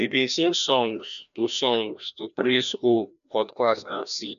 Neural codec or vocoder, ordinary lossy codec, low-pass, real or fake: codec, 16 kHz, 1 kbps, FreqCodec, larger model; none; 7.2 kHz; fake